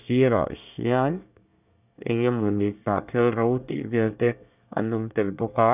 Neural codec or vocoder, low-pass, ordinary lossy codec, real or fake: codec, 24 kHz, 1 kbps, SNAC; 3.6 kHz; none; fake